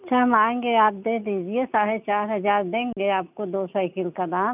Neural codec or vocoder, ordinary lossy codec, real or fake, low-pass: none; none; real; 3.6 kHz